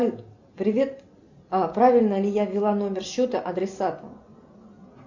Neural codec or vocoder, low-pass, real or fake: none; 7.2 kHz; real